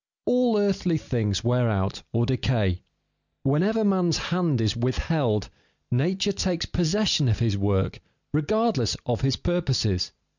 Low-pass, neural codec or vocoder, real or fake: 7.2 kHz; none; real